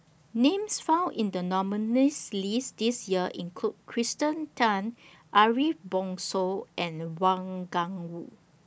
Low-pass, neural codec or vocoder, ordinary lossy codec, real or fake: none; none; none; real